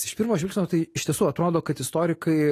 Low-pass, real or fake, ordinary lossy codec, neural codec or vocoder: 14.4 kHz; real; AAC, 48 kbps; none